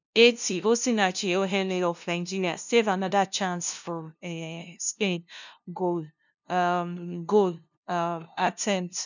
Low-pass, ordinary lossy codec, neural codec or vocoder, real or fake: 7.2 kHz; none; codec, 16 kHz, 0.5 kbps, FunCodec, trained on LibriTTS, 25 frames a second; fake